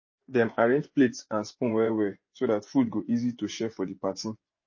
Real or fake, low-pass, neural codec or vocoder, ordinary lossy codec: fake; 7.2 kHz; vocoder, 24 kHz, 100 mel bands, Vocos; MP3, 32 kbps